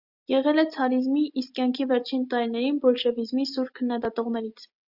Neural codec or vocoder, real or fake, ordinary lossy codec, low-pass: none; real; Opus, 64 kbps; 5.4 kHz